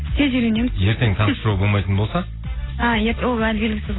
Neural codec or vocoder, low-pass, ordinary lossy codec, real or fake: none; 7.2 kHz; AAC, 16 kbps; real